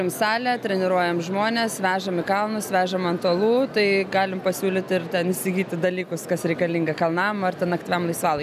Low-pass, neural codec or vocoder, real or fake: 14.4 kHz; none; real